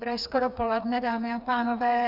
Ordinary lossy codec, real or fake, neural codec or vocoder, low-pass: AAC, 48 kbps; fake; codec, 16 kHz, 4 kbps, FreqCodec, smaller model; 5.4 kHz